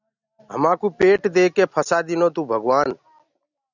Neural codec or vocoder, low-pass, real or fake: none; 7.2 kHz; real